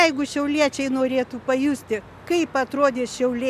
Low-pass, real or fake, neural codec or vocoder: 14.4 kHz; real; none